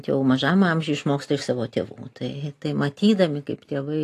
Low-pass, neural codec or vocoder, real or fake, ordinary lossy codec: 14.4 kHz; none; real; AAC, 48 kbps